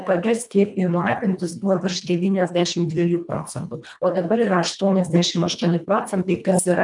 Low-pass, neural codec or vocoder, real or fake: 10.8 kHz; codec, 24 kHz, 1.5 kbps, HILCodec; fake